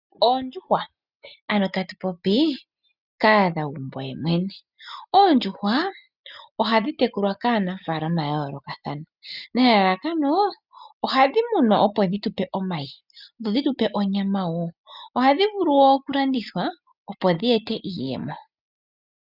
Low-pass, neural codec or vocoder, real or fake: 5.4 kHz; none; real